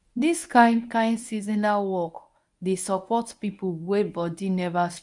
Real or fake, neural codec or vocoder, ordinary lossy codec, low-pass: fake; codec, 24 kHz, 0.9 kbps, WavTokenizer, medium speech release version 1; none; 10.8 kHz